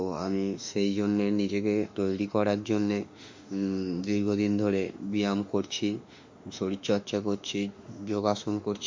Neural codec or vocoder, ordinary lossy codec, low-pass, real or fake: autoencoder, 48 kHz, 32 numbers a frame, DAC-VAE, trained on Japanese speech; MP3, 48 kbps; 7.2 kHz; fake